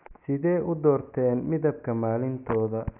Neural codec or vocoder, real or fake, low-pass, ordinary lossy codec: none; real; 3.6 kHz; none